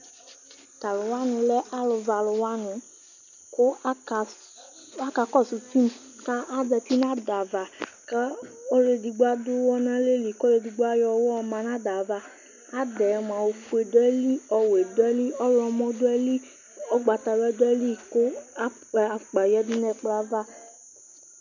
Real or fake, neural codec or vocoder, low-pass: real; none; 7.2 kHz